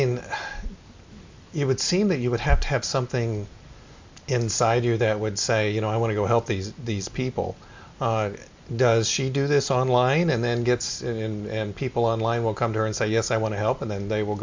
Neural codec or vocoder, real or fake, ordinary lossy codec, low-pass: none; real; MP3, 64 kbps; 7.2 kHz